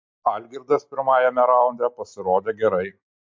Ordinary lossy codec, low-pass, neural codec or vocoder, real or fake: MP3, 48 kbps; 7.2 kHz; none; real